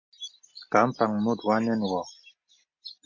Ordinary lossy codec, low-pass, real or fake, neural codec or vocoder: MP3, 48 kbps; 7.2 kHz; real; none